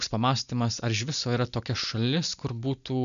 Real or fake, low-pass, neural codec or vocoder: real; 7.2 kHz; none